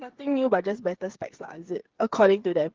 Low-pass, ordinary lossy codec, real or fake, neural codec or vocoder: 7.2 kHz; Opus, 16 kbps; fake; codec, 16 kHz, 8 kbps, FreqCodec, smaller model